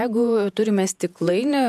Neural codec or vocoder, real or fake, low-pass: vocoder, 48 kHz, 128 mel bands, Vocos; fake; 14.4 kHz